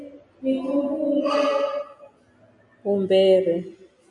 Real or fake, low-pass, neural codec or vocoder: real; 10.8 kHz; none